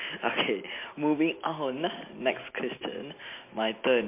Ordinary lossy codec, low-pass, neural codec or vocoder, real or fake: MP3, 24 kbps; 3.6 kHz; none; real